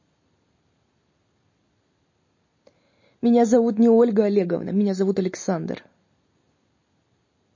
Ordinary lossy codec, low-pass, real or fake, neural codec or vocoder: MP3, 32 kbps; 7.2 kHz; real; none